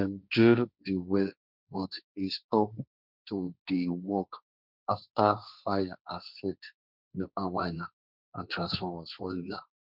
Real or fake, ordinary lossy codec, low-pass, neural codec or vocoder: fake; none; 5.4 kHz; codec, 16 kHz, 1.1 kbps, Voila-Tokenizer